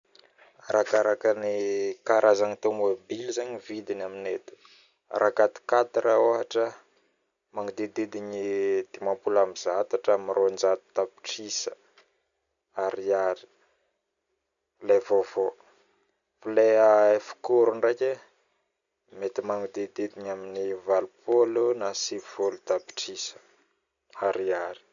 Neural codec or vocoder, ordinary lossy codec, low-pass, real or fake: none; none; 7.2 kHz; real